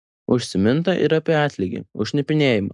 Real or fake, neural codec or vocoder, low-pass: real; none; 10.8 kHz